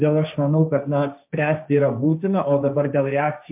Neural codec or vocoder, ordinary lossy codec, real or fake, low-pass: codec, 16 kHz, 1.1 kbps, Voila-Tokenizer; MP3, 32 kbps; fake; 3.6 kHz